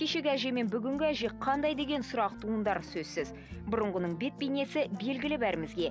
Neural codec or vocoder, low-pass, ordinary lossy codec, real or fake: none; none; none; real